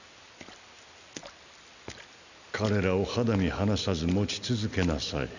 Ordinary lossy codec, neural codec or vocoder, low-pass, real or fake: none; none; 7.2 kHz; real